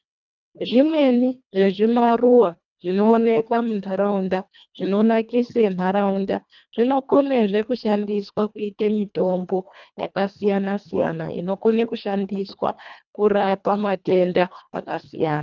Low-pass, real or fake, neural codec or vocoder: 7.2 kHz; fake; codec, 24 kHz, 1.5 kbps, HILCodec